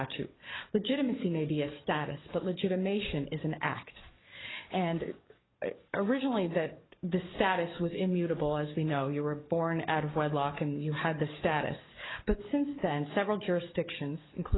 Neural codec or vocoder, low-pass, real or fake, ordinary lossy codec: codec, 44.1 kHz, 7.8 kbps, DAC; 7.2 kHz; fake; AAC, 16 kbps